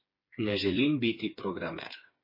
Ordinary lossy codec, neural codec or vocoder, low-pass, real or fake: MP3, 24 kbps; codec, 16 kHz, 4 kbps, FreqCodec, smaller model; 5.4 kHz; fake